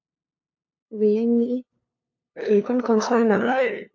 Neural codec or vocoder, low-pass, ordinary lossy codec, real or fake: codec, 16 kHz, 2 kbps, FunCodec, trained on LibriTTS, 25 frames a second; 7.2 kHz; AAC, 48 kbps; fake